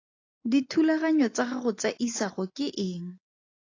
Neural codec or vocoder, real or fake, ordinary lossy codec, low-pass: none; real; AAC, 32 kbps; 7.2 kHz